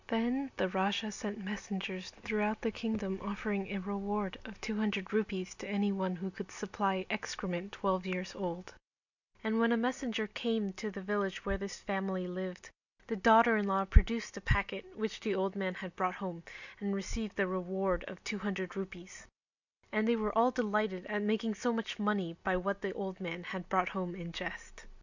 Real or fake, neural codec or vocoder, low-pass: real; none; 7.2 kHz